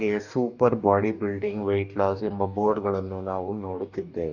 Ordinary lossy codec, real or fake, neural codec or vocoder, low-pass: none; fake; codec, 44.1 kHz, 2.6 kbps, DAC; 7.2 kHz